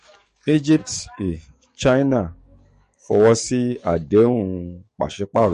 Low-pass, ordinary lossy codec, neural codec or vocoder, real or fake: 14.4 kHz; MP3, 48 kbps; codec, 44.1 kHz, 7.8 kbps, DAC; fake